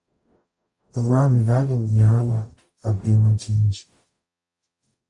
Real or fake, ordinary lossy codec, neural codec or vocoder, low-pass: fake; AAC, 64 kbps; codec, 44.1 kHz, 0.9 kbps, DAC; 10.8 kHz